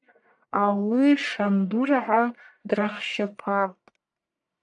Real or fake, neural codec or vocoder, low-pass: fake; codec, 44.1 kHz, 1.7 kbps, Pupu-Codec; 10.8 kHz